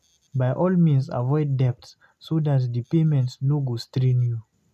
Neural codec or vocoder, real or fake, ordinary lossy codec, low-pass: none; real; none; 14.4 kHz